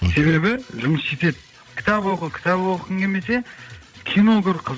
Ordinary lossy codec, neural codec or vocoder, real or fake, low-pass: none; codec, 16 kHz, 16 kbps, FreqCodec, larger model; fake; none